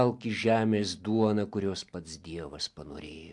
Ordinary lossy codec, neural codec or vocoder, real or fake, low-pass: MP3, 64 kbps; none; real; 10.8 kHz